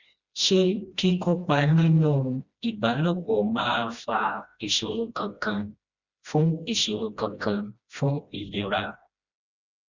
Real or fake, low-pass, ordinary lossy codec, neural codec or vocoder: fake; 7.2 kHz; Opus, 64 kbps; codec, 16 kHz, 1 kbps, FreqCodec, smaller model